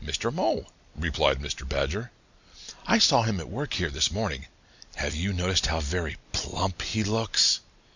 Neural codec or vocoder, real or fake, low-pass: none; real; 7.2 kHz